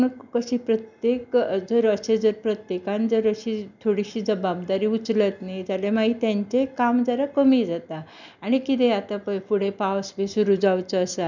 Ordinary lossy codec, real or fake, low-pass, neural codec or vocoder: none; real; 7.2 kHz; none